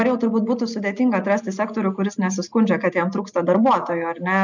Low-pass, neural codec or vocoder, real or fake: 7.2 kHz; none; real